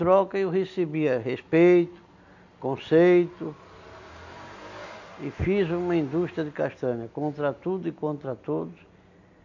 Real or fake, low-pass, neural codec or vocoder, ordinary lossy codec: real; 7.2 kHz; none; none